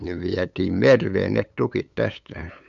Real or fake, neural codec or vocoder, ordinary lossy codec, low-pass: fake; codec, 16 kHz, 8 kbps, FunCodec, trained on LibriTTS, 25 frames a second; none; 7.2 kHz